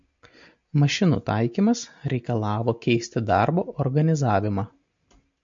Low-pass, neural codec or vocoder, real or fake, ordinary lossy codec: 7.2 kHz; none; real; MP3, 48 kbps